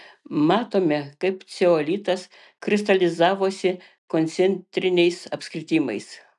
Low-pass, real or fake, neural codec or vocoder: 10.8 kHz; real; none